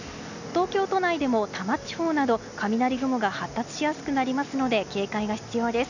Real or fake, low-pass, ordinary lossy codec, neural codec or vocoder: real; 7.2 kHz; none; none